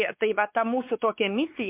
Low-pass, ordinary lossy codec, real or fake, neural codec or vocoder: 3.6 kHz; MP3, 32 kbps; fake; codec, 16 kHz, 4 kbps, X-Codec, WavLM features, trained on Multilingual LibriSpeech